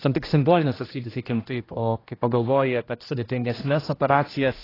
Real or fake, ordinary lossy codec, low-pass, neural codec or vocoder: fake; AAC, 24 kbps; 5.4 kHz; codec, 16 kHz, 1 kbps, X-Codec, HuBERT features, trained on general audio